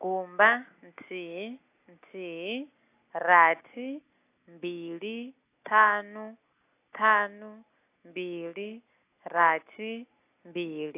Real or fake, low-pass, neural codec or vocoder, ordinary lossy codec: real; 3.6 kHz; none; AAC, 24 kbps